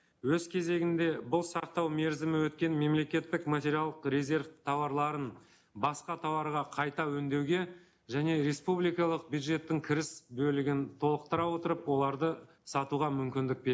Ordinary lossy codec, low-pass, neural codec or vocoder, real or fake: none; none; none; real